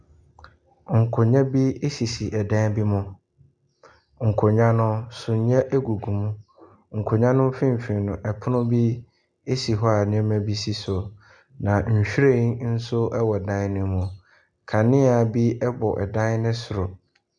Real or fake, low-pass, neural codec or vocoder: real; 9.9 kHz; none